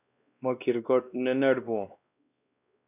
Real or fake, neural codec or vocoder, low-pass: fake; codec, 16 kHz, 1 kbps, X-Codec, WavLM features, trained on Multilingual LibriSpeech; 3.6 kHz